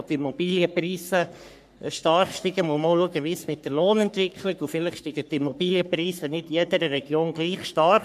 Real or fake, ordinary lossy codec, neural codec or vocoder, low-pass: fake; MP3, 96 kbps; codec, 44.1 kHz, 3.4 kbps, Pupu-Codec; 14.4 kHz